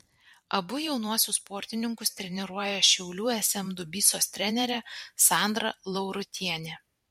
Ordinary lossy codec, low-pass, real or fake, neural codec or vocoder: MP3, 64 kbps; 19.8 kHz; fake; vocoder, 44.1 kHz, 128 mel bands every 512 samples, BigVGAN v2